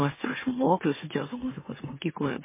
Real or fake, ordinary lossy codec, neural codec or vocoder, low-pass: fake; MP3, 16 kbps; autoencoder, 44.1 kHz, a latent of 192 numbers a frame, MeloTTS; 3.6 kHz